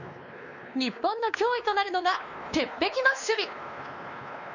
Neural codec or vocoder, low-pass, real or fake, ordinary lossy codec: codec, 16 kHz, 2 kbps, X-Codec, WavLM features, trained on Multilingual LibriSpeech; 7.2 kHz; fake; none